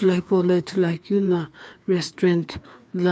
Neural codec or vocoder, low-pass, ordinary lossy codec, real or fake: codec, 16 kHz, 2 kbps, FreqCodec, larger model; none; none; fake